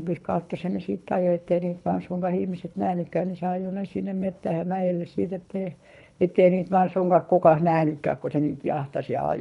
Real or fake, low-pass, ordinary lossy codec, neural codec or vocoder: fake; 10.8 kHz; none; codec, 24 kHz, 3 kbps, HILCodec